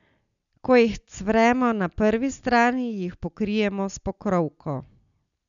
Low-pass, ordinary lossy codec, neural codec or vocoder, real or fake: 7.2 kHz; none; none; real